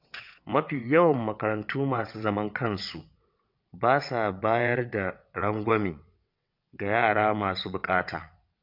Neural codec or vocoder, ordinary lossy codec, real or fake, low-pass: vocoder, 22.05 kHz, 80 mel bands, WaveNeXt; MP3, 48 kbps; fake; 5.4 kHz